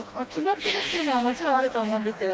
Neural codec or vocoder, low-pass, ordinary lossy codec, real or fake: codec, 16 kHz, 1 kbps, FreqCodec, smaller model; none; none; fake